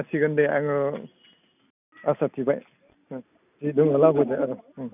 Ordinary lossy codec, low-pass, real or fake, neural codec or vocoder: none; 3.6 kHz; real; none